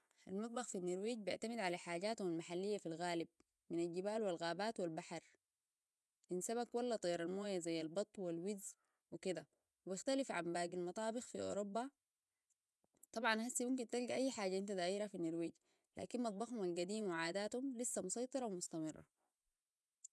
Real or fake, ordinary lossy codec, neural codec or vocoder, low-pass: fake; none; vocoder, 24 kHz, 100 mel bands, Vocos; none